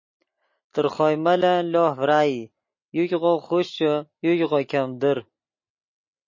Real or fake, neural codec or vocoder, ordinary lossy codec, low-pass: real; none; MP3, 32 kbps; 7.2 kHz